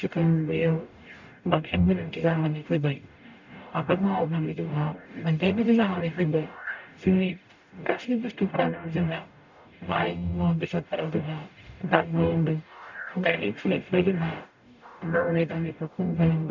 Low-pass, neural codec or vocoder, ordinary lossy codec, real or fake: 7.2 kHz; codec, 44.1 kHz, 0.9 kbps, DAC; none; fake